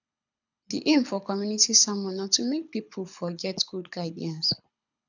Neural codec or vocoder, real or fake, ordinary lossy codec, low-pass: codec, 24 kHz, 6 kbps, HILCodec; fake; none; 7.2 kHz